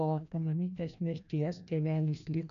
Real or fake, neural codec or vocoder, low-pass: fake; codec, 16 kHz, 1 kbps, FreqCodec, larger model; 7.2 kHz